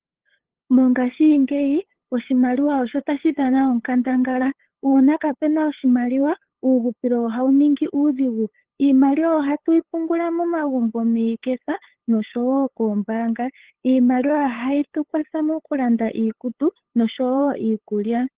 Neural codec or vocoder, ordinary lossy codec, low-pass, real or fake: codec, 16 kHz, 8 kbps, FunCodec, trained on LibriTTS, 25 frames a second; Opus, 16 kbps; 3.6 kHz; fake